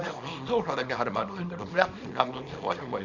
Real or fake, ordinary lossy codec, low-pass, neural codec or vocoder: fake; none; 7.2 kHz; codec, 24 kHz, 0.9 kbps, WavTokenizer, small release